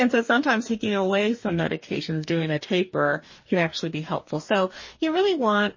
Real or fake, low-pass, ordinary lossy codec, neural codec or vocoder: fake; 7.2 kHz; MP3, 32 kbps; codec, 44.1 kHz, 2.6 kbps, DAC